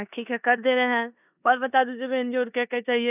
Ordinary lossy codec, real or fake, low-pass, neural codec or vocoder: none; fake; 3.6 kHz; codec, 16 kHz in and 24 kHz out, 0.9 kbps, LongCat-Audio-Codec, four codebook decoder